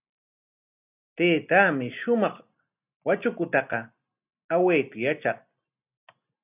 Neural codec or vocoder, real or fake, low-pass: none; real; 3.6 kHz